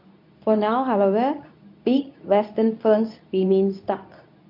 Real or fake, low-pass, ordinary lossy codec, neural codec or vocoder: fake; 5.4 kHz; none; codec, 24 kHz, 0.9 kbps, WavTokenizer, medium speech release version 2